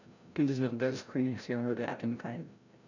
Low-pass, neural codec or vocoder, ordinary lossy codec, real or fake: 7.2 kHz; codec, 16 kHz, 0.5 kbps, FreqCodec, larger model; none; fake